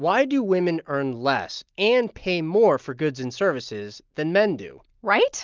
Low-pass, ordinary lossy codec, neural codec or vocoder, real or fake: 7.2 kHz; Opus, 32 kbps; none; real